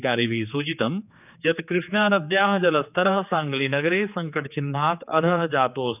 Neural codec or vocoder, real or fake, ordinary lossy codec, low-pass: codec, 16 kHz, 4 kbps, X-Codec, HuBERT features, trained on general audio; fake; none; 3.6 kHz